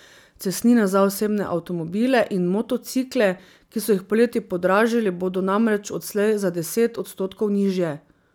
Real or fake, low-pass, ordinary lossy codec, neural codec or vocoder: real; none; none; none